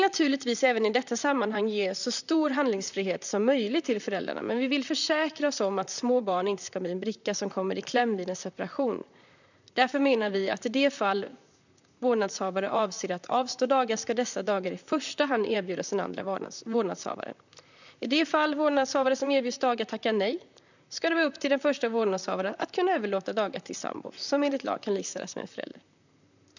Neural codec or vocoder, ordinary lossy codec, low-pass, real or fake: vocoder, 44.1 kHz, 128 mel bands, Pupu-Vocoder; none; 7.2 kHz; fake